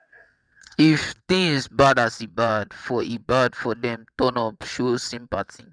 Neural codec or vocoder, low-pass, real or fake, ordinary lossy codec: vocoder, 22.05 kHz, 80 mel bands, WaveNeXt; 9.9 kHz; fake; none